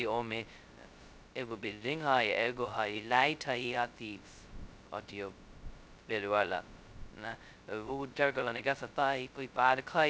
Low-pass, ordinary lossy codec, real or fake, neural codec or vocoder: none; none; fake; codec, 16 kHz, 0.2 kbps, FocalCodec